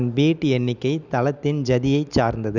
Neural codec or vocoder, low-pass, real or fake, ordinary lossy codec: none; 7.2 kHz; real; none